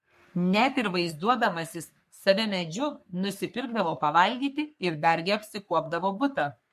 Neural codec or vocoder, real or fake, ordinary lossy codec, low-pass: codec, 44.1 kHz, 3.4 kbps, Pupu-Codec; fake; MP3, 64 kbps; 14.4 kHz